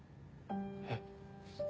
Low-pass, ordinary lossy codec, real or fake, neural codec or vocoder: none; none; real; none